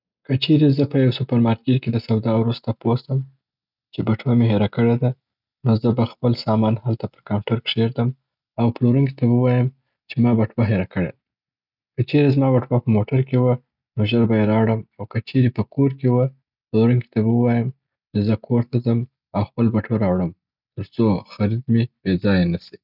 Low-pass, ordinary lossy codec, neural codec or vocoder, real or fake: 5.4 kHz; none; none; real